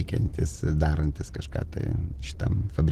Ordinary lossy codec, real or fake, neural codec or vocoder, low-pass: Opus, 16 kbps; real; none; 14.4 kHz